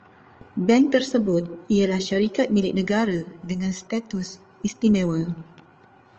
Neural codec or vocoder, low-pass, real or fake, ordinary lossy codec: codec, 16 kHz, 8 kbps, FreqCodec, larger model; 7.2 kHz; fake; Opus, 24 kbps